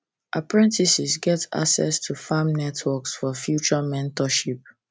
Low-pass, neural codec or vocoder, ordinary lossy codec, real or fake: none; none; none; real